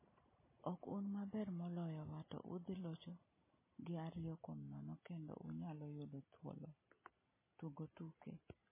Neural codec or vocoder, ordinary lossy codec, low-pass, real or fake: none; MP3, 16 kbps; 3.6 kHz; real